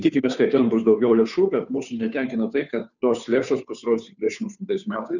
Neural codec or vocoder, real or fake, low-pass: codec, 16 kHz, 2 kbps, FunCodec, trained on Chinese and English, 25 frames a second; fake; 7.2 kHz